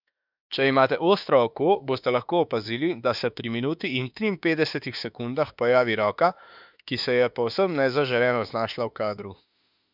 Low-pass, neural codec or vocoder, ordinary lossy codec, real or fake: 5.4 kHz; autoencoder, 48 kHz, 32 numbers a frame, DAC-VAE, trained on Japanese speech; none; fake